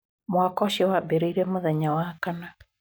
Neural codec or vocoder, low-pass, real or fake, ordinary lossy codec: none; none; real; none